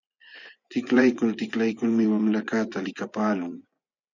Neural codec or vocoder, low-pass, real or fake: vocoder, 24 kHz, 100 mel bands, Vocos; 7.2 kHz; fake